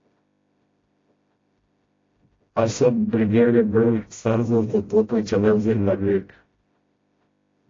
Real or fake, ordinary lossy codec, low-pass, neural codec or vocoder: fake; AAC, 32 kbps; 7.2 kHz; codec, 16 kHz, 0.5 kbps, FreqCodec, smaller model